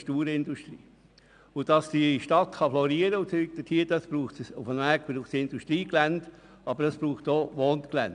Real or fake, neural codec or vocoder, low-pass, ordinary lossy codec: real; none; 9.9 kHz; none